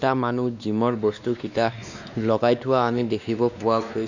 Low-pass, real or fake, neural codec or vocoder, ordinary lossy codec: 7.2 kHz; fake; codec, 16 kHz, 2 kbps, X-Codec, WavLM features, trained on Multilingual LibriSpeech; none